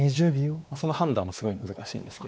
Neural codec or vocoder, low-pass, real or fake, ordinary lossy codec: codec, 16 kHz, 2 kbps, X-Codec, WavLM features, trained on Multilingual LibriSpeech; none; fake; none